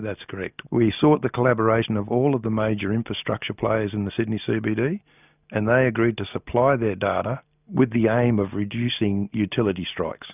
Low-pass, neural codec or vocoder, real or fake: 3.6 kHz; none; real